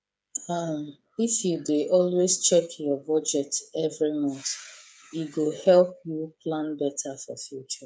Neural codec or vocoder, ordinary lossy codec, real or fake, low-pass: codec, 16 kHz, 8 kbps, FreqCodec, smaller model; none; fake; none